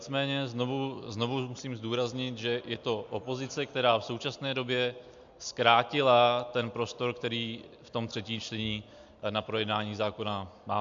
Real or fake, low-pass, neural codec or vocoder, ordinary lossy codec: real; 7.2 kHz; none; MP3, 64 kbps